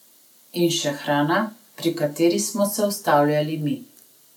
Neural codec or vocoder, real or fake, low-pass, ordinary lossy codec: none; real; 19.8 kHz; none